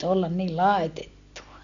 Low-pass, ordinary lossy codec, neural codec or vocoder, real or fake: 7.2 kHz; none; none; real